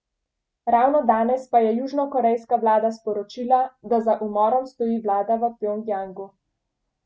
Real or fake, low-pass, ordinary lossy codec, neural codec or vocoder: real; none; none; none